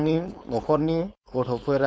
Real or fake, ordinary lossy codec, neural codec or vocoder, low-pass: fake; none; codec, 16 kHz, 4.8 kbps, FACodec; none